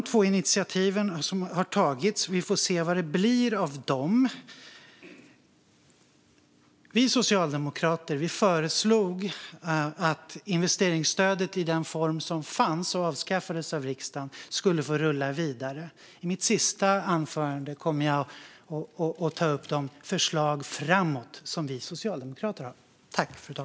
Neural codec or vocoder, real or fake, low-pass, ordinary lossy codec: none; real; none; none